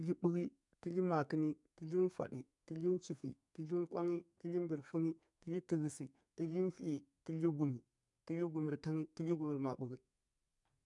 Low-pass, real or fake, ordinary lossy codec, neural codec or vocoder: 10.8 kHz; fake; none; codec, 32 kHz, 1.9 kbps, SNAC